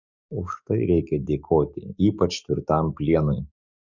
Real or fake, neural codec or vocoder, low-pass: fake; codec, 44.1 kHz, 7.8 kbps, DAC; 7.2 kHz